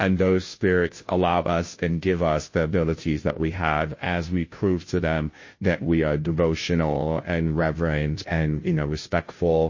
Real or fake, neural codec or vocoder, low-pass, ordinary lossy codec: fake; codec, 16 kHz, 0.5 kbps, FunCodec, trained on Chinese and English, 25 frames a second; 7.2 kHz; MP3, 32 kbps